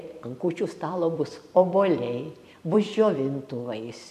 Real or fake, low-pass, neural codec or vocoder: fake; 14.4 kHz; vocoder, 44.1 kHz, 128 mel bands every 256 samples, BigVGAN v2